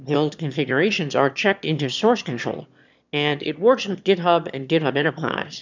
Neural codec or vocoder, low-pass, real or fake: autoencoder, 22.05 kHz, a latent of 192 numbers a frame, VITS, trained on one speaker; 7.2 kHz; fake